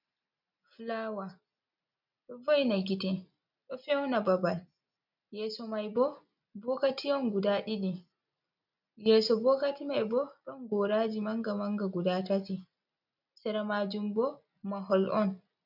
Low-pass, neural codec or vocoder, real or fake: 5.4 kHz; none; real